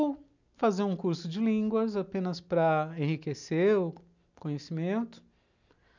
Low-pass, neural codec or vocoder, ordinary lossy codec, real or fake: 7.2 kHz; none; none; real